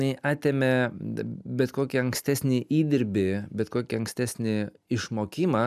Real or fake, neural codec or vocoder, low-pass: real; none; 14.4 kHz